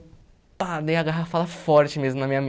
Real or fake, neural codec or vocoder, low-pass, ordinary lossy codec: real; none; none; none